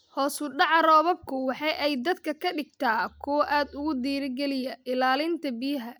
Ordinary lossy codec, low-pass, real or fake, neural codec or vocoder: none; none; real; none